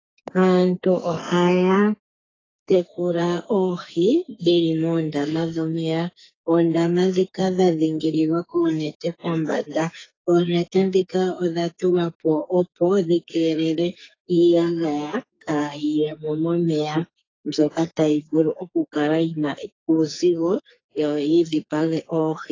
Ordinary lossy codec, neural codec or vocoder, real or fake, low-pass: AAC, 32 kbps; codec, 44.1 kHz, 2.6 kbps, SNAC; fake; 7.2 kHz